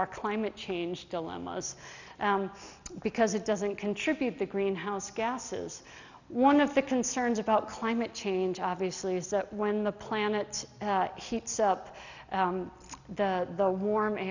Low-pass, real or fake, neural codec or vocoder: 7.2 kHz; real; none